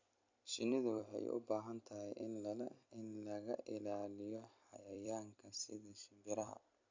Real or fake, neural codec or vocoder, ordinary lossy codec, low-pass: fake; vocoder, 44.1 kHz, 128 mel bands every 256 samples, BigVGAN v2; MP3, 48 kbps; 7.2 kHz